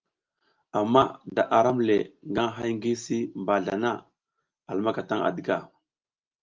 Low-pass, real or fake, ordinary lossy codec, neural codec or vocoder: 7.2 kHz; real; Opus, 24 kbps; none